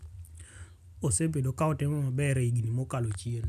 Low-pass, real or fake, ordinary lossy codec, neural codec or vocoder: 14.4 kHz; real; none; none